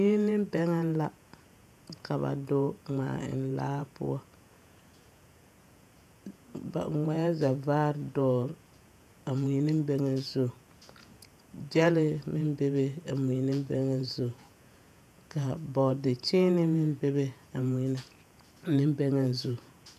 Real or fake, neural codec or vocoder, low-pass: fake; vocoder, 48 kHz, 128 mel bands, Vocos; 14.4 kHz